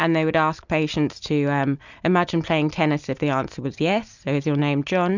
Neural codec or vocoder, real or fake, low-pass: none; real; 7.2 kHz